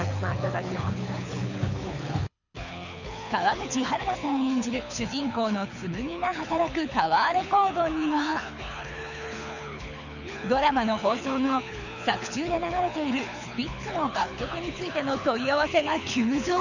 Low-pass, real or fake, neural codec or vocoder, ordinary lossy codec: 7.2 kHz; fake; codec, 24 kHz, 6 kbps, HILCodec; none